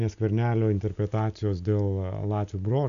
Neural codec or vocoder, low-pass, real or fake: none; 7.2 kHz; real